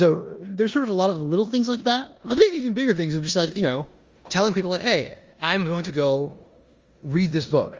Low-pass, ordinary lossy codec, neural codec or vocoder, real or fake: 7.2 kHz; Opus, 32 kbps; codec, 16 kHz in and 24 kHz out, 0.9 kbps, LongCat-Audio-Codec, four codebook decoder; fake